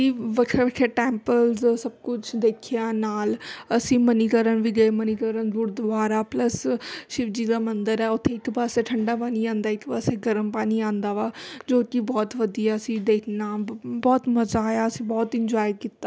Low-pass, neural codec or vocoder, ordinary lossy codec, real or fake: none; none; none; real